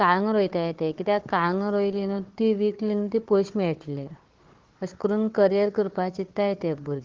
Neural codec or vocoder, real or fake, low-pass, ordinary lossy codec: codec, 16 kHz, 4 kbps, FunCodec, trained on Chinese and English, 50 frames a second; fake; 7.2 kHz; Opus, 16 kbps